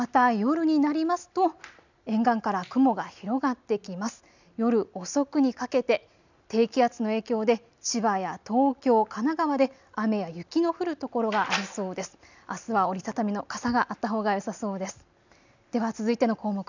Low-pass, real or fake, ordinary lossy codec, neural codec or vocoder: 7.2 kHz; real; none; none